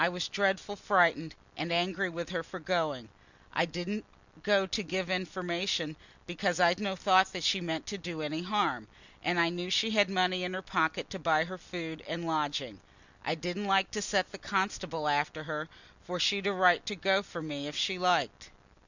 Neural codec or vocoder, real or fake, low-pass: none; real; 7.2 kHz